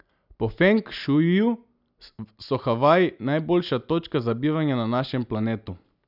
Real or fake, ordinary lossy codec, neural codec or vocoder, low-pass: real; none; none; 5.4 kHz